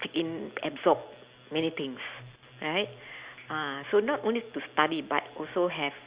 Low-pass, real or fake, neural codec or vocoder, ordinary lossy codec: 3.6 kHz; real; none; Opus, 64 kbps